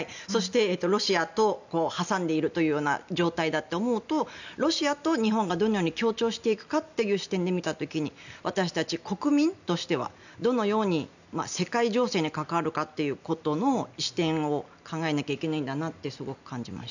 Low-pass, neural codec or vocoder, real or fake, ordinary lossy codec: 7.2 kHz; none; real; none